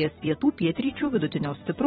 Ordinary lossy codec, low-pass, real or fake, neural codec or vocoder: AAC, 16 kbps; 19.8 kHz; fake; vocoder, 44.1 kHz, 128 mel bands every 256 samples, BigVGAN v2